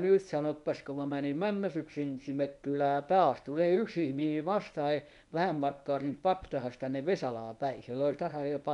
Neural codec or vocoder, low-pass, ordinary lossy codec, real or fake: codec, 24 kHz, 0.9 kbps, WavTokenizer, medium speech release version 1; 10.8 kHz; none; fake